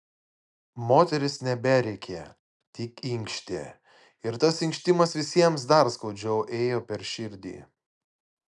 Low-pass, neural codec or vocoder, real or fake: 10.8 kHz; none; real